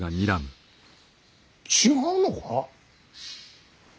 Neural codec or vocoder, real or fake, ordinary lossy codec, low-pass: none; real; none; none